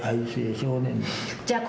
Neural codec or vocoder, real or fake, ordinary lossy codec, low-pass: none; real; none; none